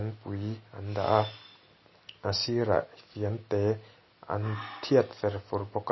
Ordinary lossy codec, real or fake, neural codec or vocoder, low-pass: MP3, 24 kbps; real; none; 7.2 kHz